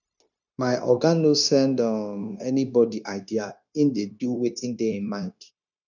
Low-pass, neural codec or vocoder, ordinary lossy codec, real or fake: 7.2 kHz; codec, 16 kHz, 0.9 kbps, LongCat-Audio-Codec; none; fake